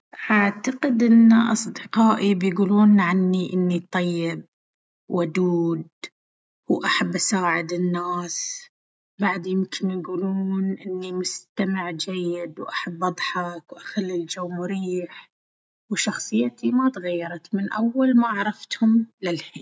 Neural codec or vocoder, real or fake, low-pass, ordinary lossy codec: none; real; none; none